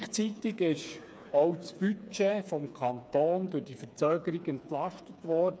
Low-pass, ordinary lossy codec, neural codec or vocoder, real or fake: none; none; codec, 16 kHz, 4 kbps, FreqCodec, smaller model; fake